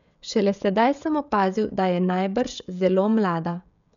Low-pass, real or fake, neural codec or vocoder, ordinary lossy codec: 7.2 kHz; fake; codec, 16 kHz, 16 kbps, FreqCodec, smaller model; none